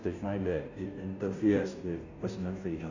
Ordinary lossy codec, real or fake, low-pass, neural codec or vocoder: none; fake; 7.2 kHz; codec, 16 kHz, 0.5 kbps, FunCodec, trained on Chinese and English, 25 frames a second